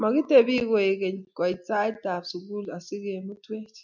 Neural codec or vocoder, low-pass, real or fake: none; 7.2 kHz; real